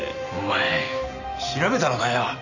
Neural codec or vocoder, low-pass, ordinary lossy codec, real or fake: none; 7.2 kHz; none; real